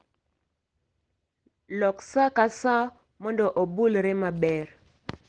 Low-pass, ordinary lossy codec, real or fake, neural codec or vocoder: 9.9 kHz; Opus, 16 kbps; real; none